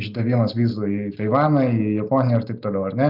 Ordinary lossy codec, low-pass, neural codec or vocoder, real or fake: Opus, 64 kbps; 5.4 kHz; none; real